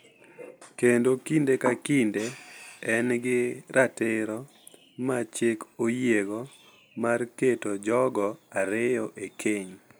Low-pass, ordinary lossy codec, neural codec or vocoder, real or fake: none; none; none; real